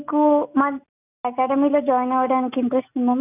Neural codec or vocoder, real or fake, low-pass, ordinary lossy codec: none; real; 3.6 kHz; none